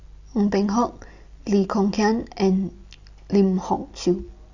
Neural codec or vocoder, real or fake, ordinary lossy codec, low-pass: none; real; AAC, 48 kbps; 7.2 kHz